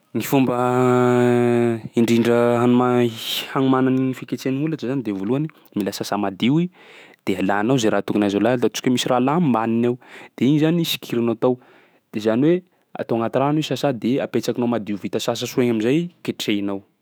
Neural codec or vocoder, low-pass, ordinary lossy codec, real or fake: autoencoder, 48 kHz, 128 numbers a frame, DAC-VAE, trained on Japanese speech; none; none; fake